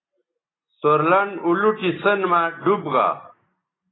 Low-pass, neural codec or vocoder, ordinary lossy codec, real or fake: 7.2 kHz; none; AAC, 16 kbps; real